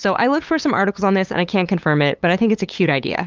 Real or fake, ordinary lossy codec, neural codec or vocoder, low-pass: fake; Opus, 24 kbps; codec, 16 kHz, 8 kbps, FunCodec, trained on LibriTTS, 25 frames a second; 7.2 kHz